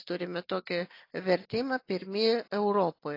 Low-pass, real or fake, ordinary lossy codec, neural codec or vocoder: 5.4 kHz; real; AAC, 32 kbps; none